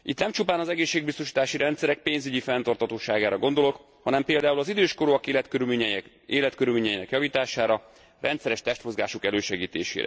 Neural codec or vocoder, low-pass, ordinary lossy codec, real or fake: none; none; none; real